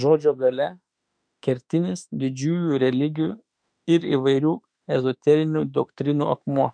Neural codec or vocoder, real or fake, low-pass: autoencoder, 48 kHz, 32 numbers a frame, DAC-VAE, trained on Japanese speech; fake; 9.9 kHz